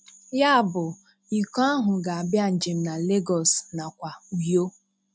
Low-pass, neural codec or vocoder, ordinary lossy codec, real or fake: none; none; none; real